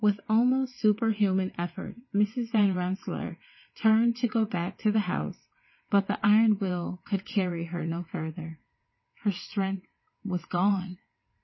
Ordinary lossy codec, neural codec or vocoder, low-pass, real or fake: MP3, 24 kbps; vocoder, 22.05 kHz, 80 mel bands, WaveNeXt; 7.2 kHz; fake